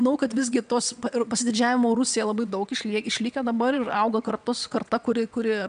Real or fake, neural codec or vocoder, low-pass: fake; vocoder, 22.05 kHz, 80 mel bands, WaveNeXt; 9.9 kHz